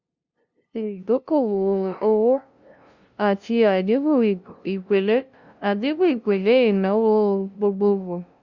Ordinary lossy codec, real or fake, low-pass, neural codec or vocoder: Opus, 64 kbps; fake; 7.2 kHz; codec, 16 kHz, 0.5 kbps, FunCodec, trained on LibriTTS, 25 frames a second